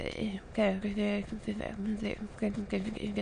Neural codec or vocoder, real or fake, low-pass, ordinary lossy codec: autoencoder, 22.05 kHz, a latent of 192 numbers a frame, VITS, trained on many speakers; fake; 9.9 kHz; MP3, 64 kbps